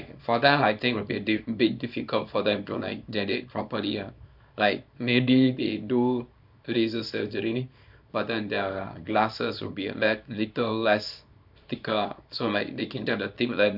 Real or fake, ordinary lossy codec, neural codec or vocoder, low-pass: fake; none; codec, 24 kHz, 0.9 kbps, WavTokenizer, small release; 5.4 kHz